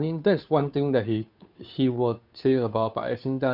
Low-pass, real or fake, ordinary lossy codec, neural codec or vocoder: 5.4 kHz; fake; none; codec, 16 kHz, 2 kbps, FunCodec, trained on Chinese and English, 25 frames a second